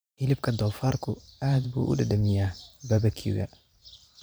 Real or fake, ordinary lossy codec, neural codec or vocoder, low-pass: fake; none; vocoder, 44.1 kHz, 128 mel bands every 512 samples, BigVGAN v2; none